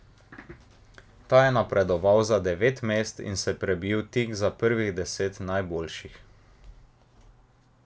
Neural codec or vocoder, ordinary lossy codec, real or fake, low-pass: none; none; real; none